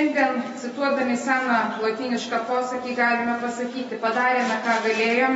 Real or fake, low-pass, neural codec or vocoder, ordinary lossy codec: real; 9.9 kHz; none; AAC, 24 kbps